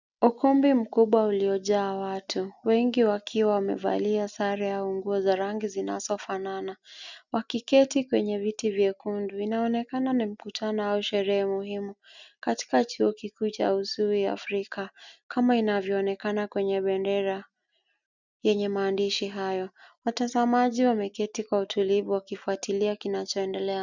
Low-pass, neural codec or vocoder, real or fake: 7.2 kHz; none; real